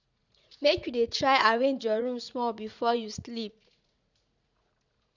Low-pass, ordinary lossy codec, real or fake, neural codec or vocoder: 7.2 kHz; none; fake; vocoder, 44.1 kHz, 80 mel bands, Vocos